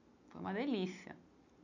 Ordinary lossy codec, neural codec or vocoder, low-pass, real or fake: none; none; 7.2 kHz; real